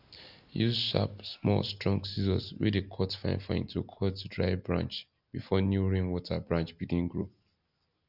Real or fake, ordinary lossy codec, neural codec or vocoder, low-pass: real; none; none; 5.4 kHz